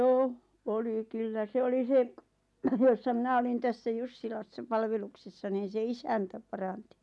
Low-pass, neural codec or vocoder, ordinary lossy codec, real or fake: none; none; none; real